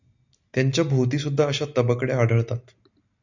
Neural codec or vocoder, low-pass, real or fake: none; 7.2 kHz; real